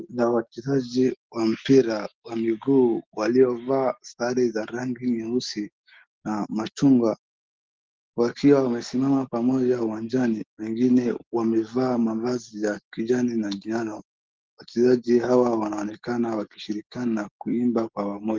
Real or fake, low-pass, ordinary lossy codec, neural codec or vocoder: real; 7.2 kHz; Opus, 16 kbps; none